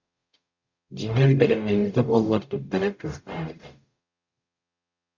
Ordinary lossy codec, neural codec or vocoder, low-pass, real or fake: Opus, 64 kbps; codec, 44.1 kHz, 0.9 kbps, DAC; 7.2 kHz; fake